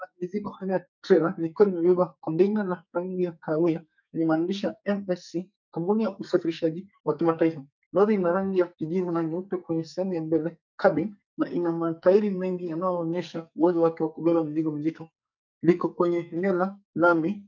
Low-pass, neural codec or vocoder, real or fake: 7.2 kHz; codec, 44.1 kHz, 2.6 kbps, SNAC; fake